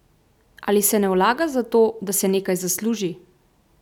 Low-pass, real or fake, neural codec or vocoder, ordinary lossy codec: 19.8 kHz; real; none; none